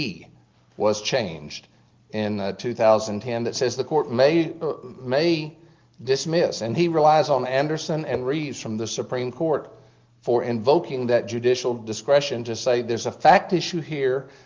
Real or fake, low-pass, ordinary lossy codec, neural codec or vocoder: real; 7.2 kHz; Opus, 32 kbps; none